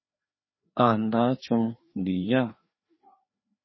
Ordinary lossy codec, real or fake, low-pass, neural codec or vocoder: MP3, 24 kbps; fake; 7.2 kHz; codec, 16 kHz, 2 kbps, FreqCodec, larger model